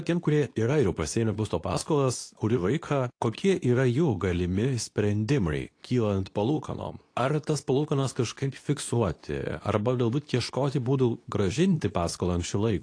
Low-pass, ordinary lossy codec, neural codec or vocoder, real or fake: 9.9 kHz; AAC, 48 kbps; codec, 24 kHz, 0.9 kbps, WavTokenizer, medium speech release version 2; fake